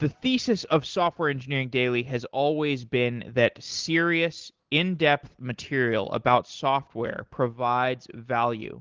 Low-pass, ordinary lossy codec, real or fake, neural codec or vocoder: 7.2 kHz; Opus, 16 kbps; real; none